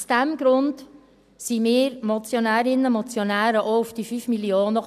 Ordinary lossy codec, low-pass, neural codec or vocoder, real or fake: none; 14.4 kHz; none; real